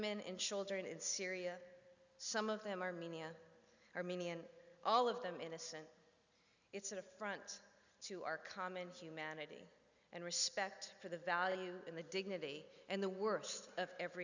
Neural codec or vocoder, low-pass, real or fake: none; 7.2 kHz; real